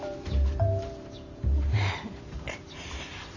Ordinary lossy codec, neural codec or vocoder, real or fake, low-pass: none; vocoder, 44.1 kHz, 128 mel bands every 512 samples, BigVGAN v2; fake; 7.2 kHz